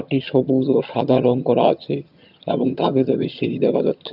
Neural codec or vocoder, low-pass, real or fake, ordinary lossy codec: vocoder, 22.05 kHz, 80 mel bands, HiFi-GAN; 5.4 kHz; fake; none